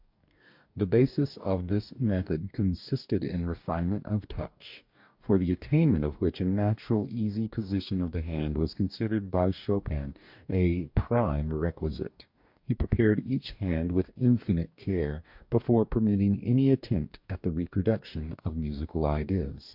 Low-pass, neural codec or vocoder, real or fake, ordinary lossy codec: 5.4 kHz; codec, 44.1 kHz, 2.6 kbps, DAC; fake; MP3, 48 kbps